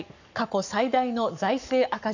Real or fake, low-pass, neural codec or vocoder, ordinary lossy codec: fake; 7.2 kHz; codec, 44.1 kHz, 7.8 kbps, Pupu-Codec; none